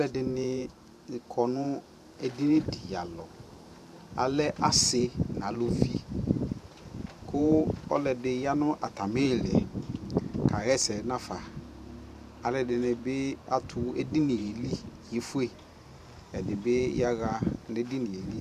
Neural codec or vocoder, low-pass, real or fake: vocoder, 44.1 kHz, 128 mel bands every 512 samples, BigVGAN v2; 14.4 kHz; fake